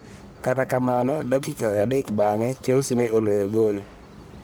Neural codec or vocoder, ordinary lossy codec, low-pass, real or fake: codec, 44.1 kHz, 1.7 kbps, Pupu-Codec; none; none; fake